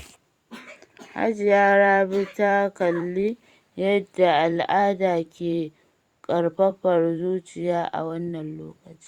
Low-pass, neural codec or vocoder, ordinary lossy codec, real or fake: 19.8 kHz; vocoder, 44.1 kHz, 128 mel bands every 512 samples, BigVGAN v2; Opus, 64 kbps; fake